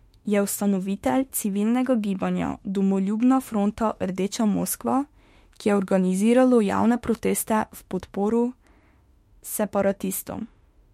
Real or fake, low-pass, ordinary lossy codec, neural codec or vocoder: fake; 19.8 kHz; MP3, 64 kbps; autoencoder, 48 kHz, 32 numbers a frame, DAC-VAE, trained on Japanese speech